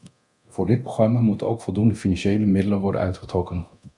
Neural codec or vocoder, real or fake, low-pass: codec, 24 kHz, 0.9 kbps, DualCodec; fake; 10.8 kHz